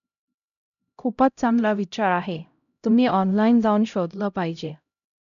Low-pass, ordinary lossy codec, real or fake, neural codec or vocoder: 7.2 kHz; MP3, 96 kbps; fake; codec, 16 kHz, 0.5 kbps, X-Codec, HuBERT features, trained on LibriSpeech